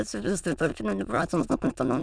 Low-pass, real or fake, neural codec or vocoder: 9.9 kHz; fake; autoencoder, 22.05 kHz, a latent of 192 numbers a frame, VITS, trained on many speakers